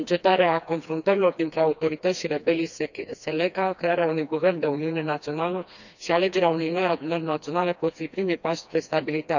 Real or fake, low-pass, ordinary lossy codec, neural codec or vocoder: fake; 7.2 kHz; none; codec, 16 kHz, 2 kbps, FreqCodec, smaller model